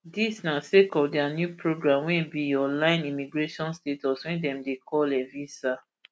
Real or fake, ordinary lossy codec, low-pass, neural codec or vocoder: real; none; none; none